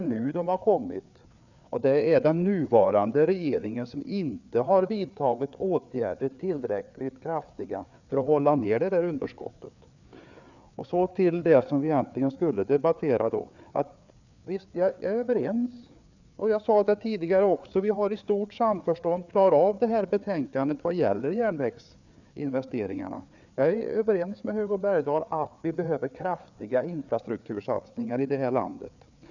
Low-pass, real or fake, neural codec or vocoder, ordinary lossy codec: 7.2 kHz; fake; codec, 16 kHz, 4 kbps, FreqCodec, larger model; none